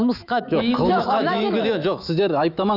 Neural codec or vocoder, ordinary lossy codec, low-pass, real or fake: autoencoder, 48 kHz, 128 numbers a frame, DAC-VAE, trained on Japanese speech; none; 5.4 kHz; fake